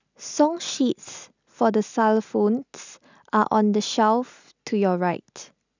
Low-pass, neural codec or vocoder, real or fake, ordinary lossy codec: 7.2 kHz; none; real; none